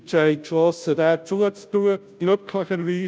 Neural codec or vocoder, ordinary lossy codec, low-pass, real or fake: codec, 16 kHz, 0.5 kbps, FunCodec, trained on Chinese and English, 25 frames a second; none; none; fake